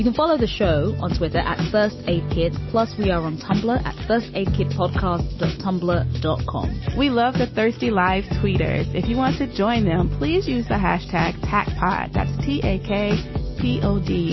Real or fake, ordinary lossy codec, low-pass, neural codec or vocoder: real; MP3, 24 kbps; 7.2 kHz; none